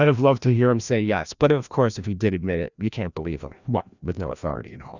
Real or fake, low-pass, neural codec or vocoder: fake; 7.2 kHz; codec, 16 kHz, 1 kbps, FreqCodec, larger model